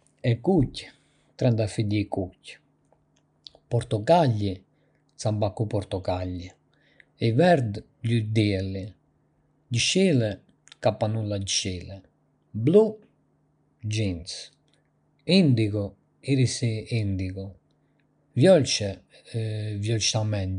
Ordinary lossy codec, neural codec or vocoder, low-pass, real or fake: none; none; 9.9 kHz; real